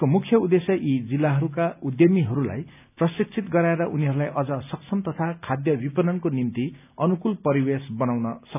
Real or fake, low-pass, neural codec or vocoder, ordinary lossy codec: real; 3.6 kHz; none; none